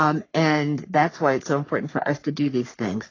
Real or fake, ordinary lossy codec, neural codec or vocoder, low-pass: fake; AAC, 32 kbps; codec, 44.1 kHz, 3.4 kbps, Pupu-Codec; 7.2 kHz